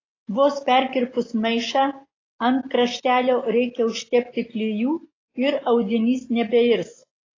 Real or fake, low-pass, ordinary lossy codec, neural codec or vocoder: real; 7.2 kHz; AAC, 32 kbps; none